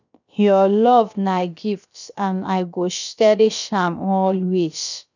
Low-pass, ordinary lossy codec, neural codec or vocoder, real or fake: 7.2 kHz; none; codec, 16 kHz, about 1 kbps, DyCAST, with the encoder's durations; fake